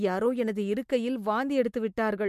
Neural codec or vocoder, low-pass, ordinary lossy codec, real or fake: none; 14.4 kHz; MP3, 64 kbps; real